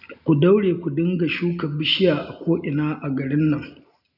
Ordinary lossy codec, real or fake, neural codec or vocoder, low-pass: none; real; none; 5.4 kHz